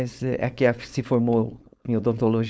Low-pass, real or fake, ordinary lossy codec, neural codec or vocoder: none; fake; none; codec, 16 kHz, 4.8 kbps, FACodec